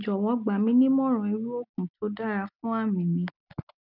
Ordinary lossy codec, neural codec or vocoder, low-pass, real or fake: none; none; 5.4 kHz; real